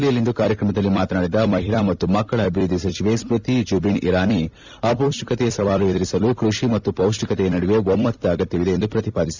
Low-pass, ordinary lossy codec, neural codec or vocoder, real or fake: 7.2 kHz; Opus, 64 kbps; vocoder, 44.1 kHz, 128 mel bands every 256 samples, BigVGAN v2; fake